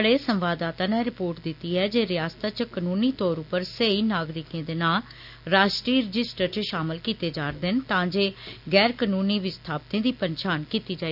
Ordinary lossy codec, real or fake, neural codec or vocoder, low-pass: none; real; none; 5.4 kHz